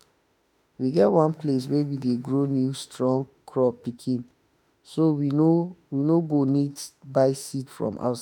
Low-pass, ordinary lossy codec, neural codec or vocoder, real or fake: 19.8 kHz; none; autoencoder, 48 kHz, 32 numbers a frame, DAC-VAE, trained on Japanese speech; fake